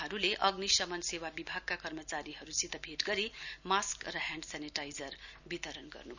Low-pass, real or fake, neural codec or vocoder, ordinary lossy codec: 7.2 kHz; real; none; none